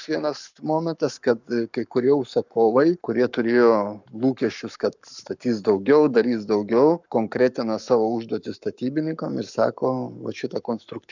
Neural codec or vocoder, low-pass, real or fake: codec, 44.1 kHz, 7.8 kbps, Pupu-Codec; 7.2 kHz; fake